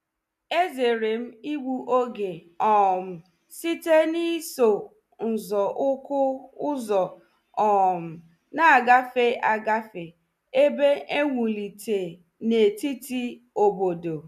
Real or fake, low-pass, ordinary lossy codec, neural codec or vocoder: real; 14.4 kHz; none; none